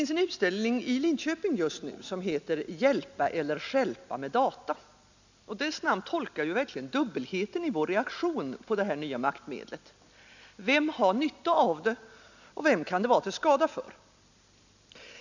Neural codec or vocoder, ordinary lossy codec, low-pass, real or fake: none; none; 7.2 kHz; real